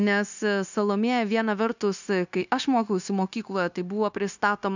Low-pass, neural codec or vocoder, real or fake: 7.2 kHz; codec, 16 kHz, 0.9 kbps, LongCat-Audio-Codec; fake